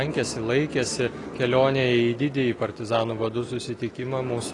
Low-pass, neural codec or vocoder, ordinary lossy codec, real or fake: 10.8 kHz; none; AAC, 32 kbps; real